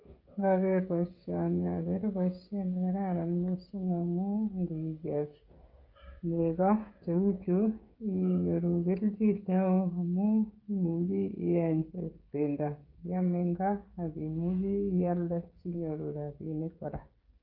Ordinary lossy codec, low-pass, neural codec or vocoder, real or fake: Opus, 24 kbps; 5.4 kHz; codec, 16 kHz, 16 kbps, FreqCodec, smaller model; fake